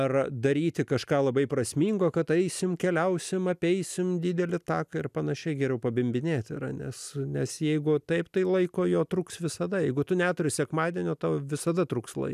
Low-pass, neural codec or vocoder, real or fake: 14.4 kHz; none; real